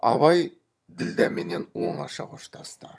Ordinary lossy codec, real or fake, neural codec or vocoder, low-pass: none; fake; vocoder, 22.05 kHz, 80 mel bands, HiFi-GAN; none